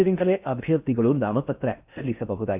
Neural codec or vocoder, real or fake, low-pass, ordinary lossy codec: codec, 16 kHz in and 24 kHz out, 0.6 kbps, FocalCodec, streaming, 4096 codes; fake; 3.6 kHz; Opus, 64 kbps